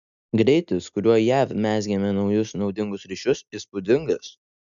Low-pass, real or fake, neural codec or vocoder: 7.2 kHz; real; none